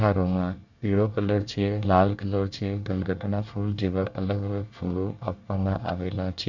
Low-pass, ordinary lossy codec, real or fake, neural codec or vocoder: 7.2 kHz; none; fake; codec, 24 kHz, 1 kbps, SNAC